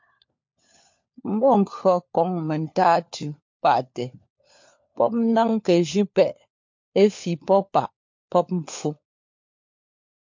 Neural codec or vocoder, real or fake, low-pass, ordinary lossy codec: codec, 16 kHz, 4 kbps, FunCodec, trained on LibriTTS, 50 frames a second; fake; 7.2 kHz; MP3, 48 kbps